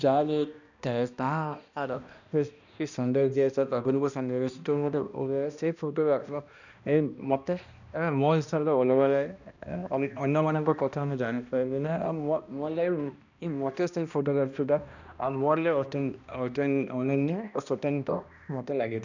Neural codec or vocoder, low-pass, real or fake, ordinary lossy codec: codec, 16 kHz, 1 kbps, X-Codec, HuBERT features, trained on balanced general audio; 7.2 kHz; fake; none